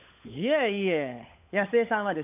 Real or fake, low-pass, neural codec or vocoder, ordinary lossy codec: fake; 3.6 kHz; codec, 16 kHz, 16 kbps, FunCodec, trained on LibriTTS, 50 frames a second; none